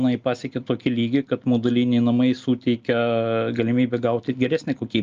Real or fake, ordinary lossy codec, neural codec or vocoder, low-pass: real; Opus, 24 kbps; none; 7.2 kHz